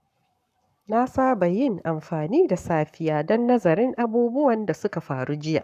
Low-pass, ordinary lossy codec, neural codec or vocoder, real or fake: 14.4 kHz; none; codec, 44.1 kHz, 7.8 kbps, Pupu-Codec; fake